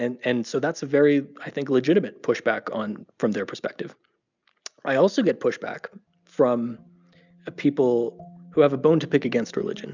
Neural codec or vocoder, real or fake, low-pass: none; real; 7.2 kHz